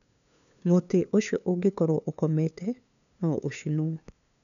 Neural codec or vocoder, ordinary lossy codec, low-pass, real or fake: codec, 16 kHz, 2 kbps, FunCodec, trained on LibriTTS, 25 frames a second; none; 7.2 kHz; fake